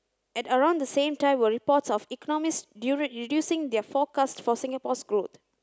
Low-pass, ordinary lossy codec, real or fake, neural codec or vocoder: none; none; real; none